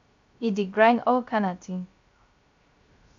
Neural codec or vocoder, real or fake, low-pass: codec, 16 kHz, 0.3 kbps, FocalCodec; fake; 7.2 kHz